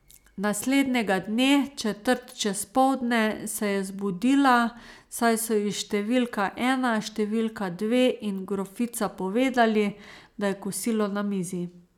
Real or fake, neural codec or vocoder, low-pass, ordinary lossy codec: real; none; 19.8 kHz; none